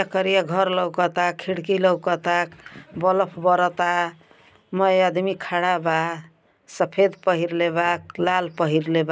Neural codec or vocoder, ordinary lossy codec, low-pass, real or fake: none; none; none; real